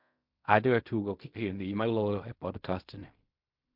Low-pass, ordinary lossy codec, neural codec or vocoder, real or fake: 5.4 kHz; MP3, 48 kbps; codec, 16 kHz in and 24 kHz out, 0.4 kbps, LongCat-Audio-Codec, fine tuned four codebook decoder; fake